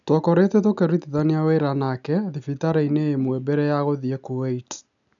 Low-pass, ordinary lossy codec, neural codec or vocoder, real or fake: 7.2 kHz; none; none; real